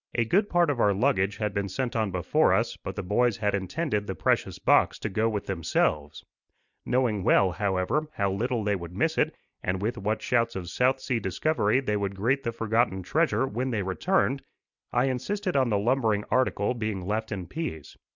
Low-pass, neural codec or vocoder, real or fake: 7.2 kHz; none; real